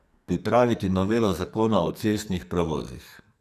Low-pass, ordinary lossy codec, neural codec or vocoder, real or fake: 14.4 kHz; none; codec, 44.1 kHz, 2.6 kbps, SNAC; fake